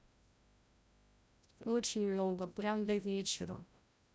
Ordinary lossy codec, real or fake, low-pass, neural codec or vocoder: none; fake; none; codec, 16 kHz, 0.5 kbps, FreqCodec, larger model